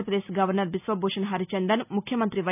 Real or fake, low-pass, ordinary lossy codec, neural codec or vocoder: real; 3.6 kHz; none; none